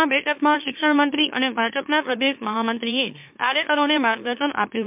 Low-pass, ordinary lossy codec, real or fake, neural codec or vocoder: 3.6 kHz; MP3, 32 kbps; fake; autoencoder, 44.1 kHz, a latent of 192 numbers a frame, MeloTTS